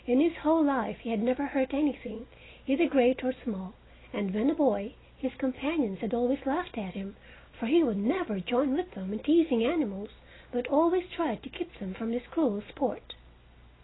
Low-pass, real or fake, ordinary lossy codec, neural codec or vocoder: 7.2 kHz; real; AAC, 16 kbps; none